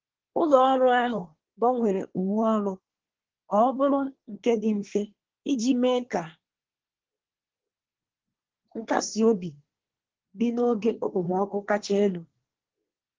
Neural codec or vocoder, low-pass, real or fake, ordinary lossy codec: codec, 24 kHz, 1 kbps, SNAC; 7.2 kHz; fake; Opus, 16 kbps